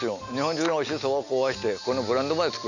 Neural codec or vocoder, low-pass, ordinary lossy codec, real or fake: none; 7.2 kHz; none; real